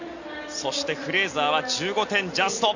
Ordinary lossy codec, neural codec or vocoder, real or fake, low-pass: none; none; real; 7.2 kHz